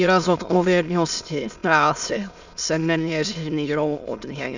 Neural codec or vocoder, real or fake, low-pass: autoencoder, 22.05 kHz, a latent of 192 numbers a frame, VITS, trained on many speakers; fake; 7.2 kHz